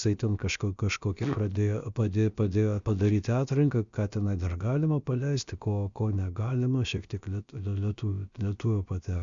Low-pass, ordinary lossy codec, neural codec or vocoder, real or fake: 7.2 kHz; Opus, 64 kbps; codec, 16 kHz, about 1 kbps, DyCAST, with the encoder's durations; fake